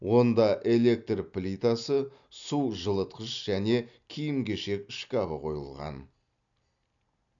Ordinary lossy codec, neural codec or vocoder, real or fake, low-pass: none; none; real; 7.2 kHz